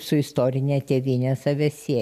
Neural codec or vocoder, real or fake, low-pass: none; real; 14.4 kHz